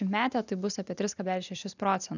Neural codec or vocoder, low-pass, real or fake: none; 7.2 kHz; real